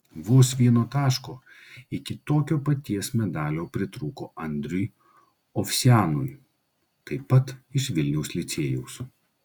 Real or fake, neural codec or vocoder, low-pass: real; none; 19.8 kHz